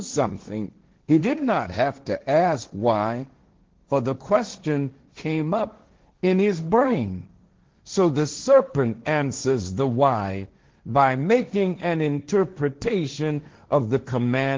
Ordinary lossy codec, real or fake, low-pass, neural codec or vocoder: Opus, 16 kbps; fake; 7.2 kHz; codec, 16 kHz, 1.1 kbps, Voila-Tokenizer